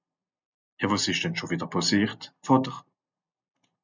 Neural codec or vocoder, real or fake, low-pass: none; real; 7.2 kHz